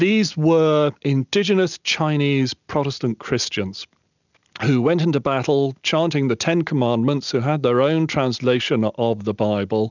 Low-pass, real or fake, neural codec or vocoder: 7.2 kHz; real; none